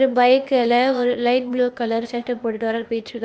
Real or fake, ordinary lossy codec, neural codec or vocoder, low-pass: fake; none; codec, 16 kHz, 0.8 kbps, ZipCodec; none